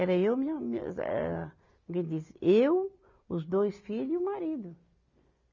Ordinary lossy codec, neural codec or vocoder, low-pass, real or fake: none; none; 7.2 kHz; real